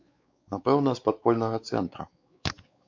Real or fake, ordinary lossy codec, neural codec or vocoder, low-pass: fake; MP3, 48 kbps; codec, 16 kHz, 4 kbps, X-Codec, WavLM features, trained on Multilingual LibriSpeech; 7.2 kHz